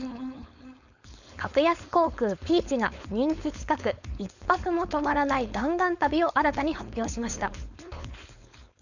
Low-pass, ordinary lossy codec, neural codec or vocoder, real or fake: 7.2 kHz; none; codec, 16 kHz, 4.8 kbps, FACodec; fake